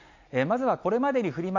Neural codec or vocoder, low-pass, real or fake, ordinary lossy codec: none; 7.2 kHz; real; none